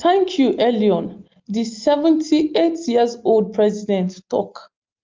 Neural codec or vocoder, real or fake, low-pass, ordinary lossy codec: none; real; 7.2 kHz; Opus, 24 kbps